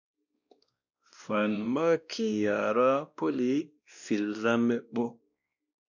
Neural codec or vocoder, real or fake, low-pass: codec, 16 kHz, 1 kbps, X-Codec, WavLM features, trained on Multilingual LibriSpeech; fake; 7.2 kHz